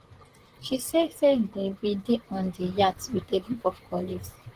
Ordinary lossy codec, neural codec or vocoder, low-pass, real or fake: Opus, 16 kbps; vocoder, 44.1 kHz, 128 mel bands, Pupu-Vocoder; 14.4 kHz; fake